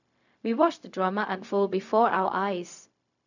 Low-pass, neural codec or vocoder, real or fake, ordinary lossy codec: 7.2 kHz; codec, 16 kHz, 0.4 kbps, LongCat-Audio-Codec; fake; none